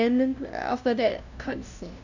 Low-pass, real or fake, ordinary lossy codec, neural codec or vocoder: 7.2 kHz; fake; none; codec, 16 kHz, 0.5 kbps, FunCodec, trained on LibriTTS, 25 frames a second